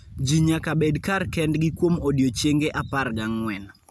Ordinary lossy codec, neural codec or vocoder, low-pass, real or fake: none; none; none; real